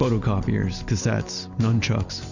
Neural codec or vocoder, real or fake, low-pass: none; real; 7.2 kHz